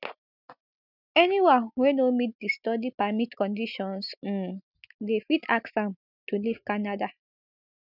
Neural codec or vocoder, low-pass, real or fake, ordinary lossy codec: none; 5.4 kHz; real; none